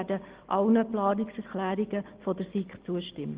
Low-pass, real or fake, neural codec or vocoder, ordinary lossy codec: 3.6 kHz; real; none; Opus, 16 kbps